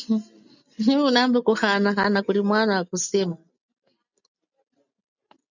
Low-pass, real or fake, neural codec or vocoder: 7.2 kHz; real; none